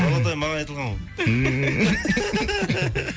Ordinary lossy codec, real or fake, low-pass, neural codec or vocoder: none; real; none; none